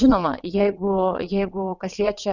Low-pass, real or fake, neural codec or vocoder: 7.2 kHz; fake; vocoder, 22.05 kHz, 80 mel bands, WaveNeXt